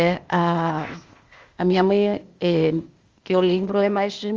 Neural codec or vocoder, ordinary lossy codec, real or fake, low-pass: codec, 16 kHz, 0.8 kbps, ZipCodec; Opus, 32 kbps; fake; 7.2 kHz